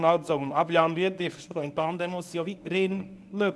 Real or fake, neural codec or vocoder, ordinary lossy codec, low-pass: fake; codec, 24 kHz, 0.9 kbps, WavTokenizer, medium speech release version 1; none; none